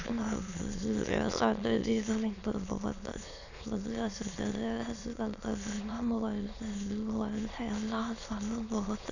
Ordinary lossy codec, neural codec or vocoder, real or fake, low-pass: none; autoencoder, 22.05 kHz, a latent of 192 numbers a frame, VITS, trained on many speakers; fake; 7.2 kHz